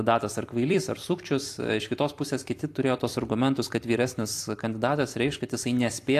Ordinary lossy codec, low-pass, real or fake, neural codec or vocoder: AAC, 64 kbps; 14.4 kHz; fake; vocoder, 44.1 kHz, 128 mel bands every 256 samples, BigVGAN v2